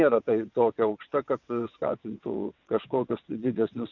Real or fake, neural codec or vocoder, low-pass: fake; vocoder, 22.05 kHz, 80 mel bands, WaveNeXt; 7.2 kHz